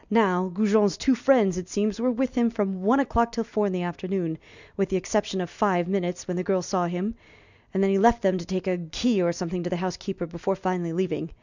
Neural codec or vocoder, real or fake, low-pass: none; real; 7.2 kHz